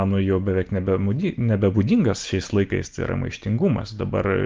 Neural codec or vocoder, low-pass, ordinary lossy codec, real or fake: none; 7.2 kHz; Opus, 24 kbps; real